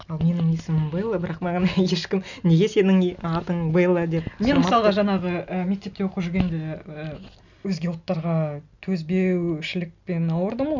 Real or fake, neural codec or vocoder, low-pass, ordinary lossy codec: real; none; 7.2 kHz; none